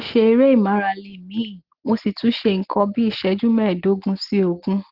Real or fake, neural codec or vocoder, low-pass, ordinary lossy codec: real; none; 5.4 kHz; Opus, 16 kbps